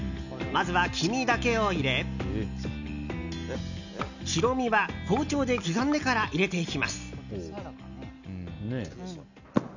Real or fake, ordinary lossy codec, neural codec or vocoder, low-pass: real; none; none; 7.2 kHz